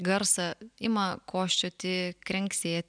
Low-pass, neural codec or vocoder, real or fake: 9.9 kHz; none; real